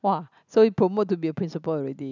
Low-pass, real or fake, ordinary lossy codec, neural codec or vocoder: 7.2 kHz; real; none; none